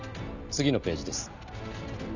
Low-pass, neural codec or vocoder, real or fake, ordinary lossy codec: 7.2 kHz; none; real; none